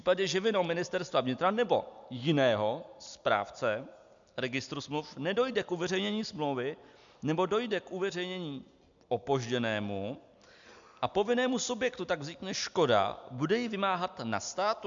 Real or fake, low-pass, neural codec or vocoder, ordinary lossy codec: real; 7.2 kHz; none; MP3, 64 kbps